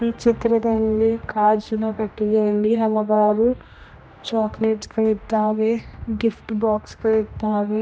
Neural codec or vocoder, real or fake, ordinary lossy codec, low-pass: codec, 16 kHz, 1 kbps, X-Codec, HuBERT features, trained on general audio; fake; none; none